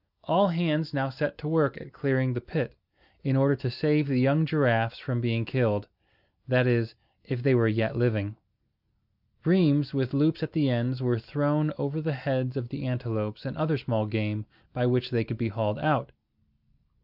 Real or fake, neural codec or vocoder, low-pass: real; none; 5.4 kHz